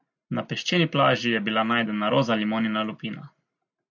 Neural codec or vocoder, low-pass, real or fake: none; 7.2 kHz; real